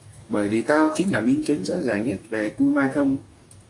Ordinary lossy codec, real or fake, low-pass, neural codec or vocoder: AAC, 48 kbps; fake; 10.8 kHz; codec, 44.1 kHz, 2.6 kbps, DAC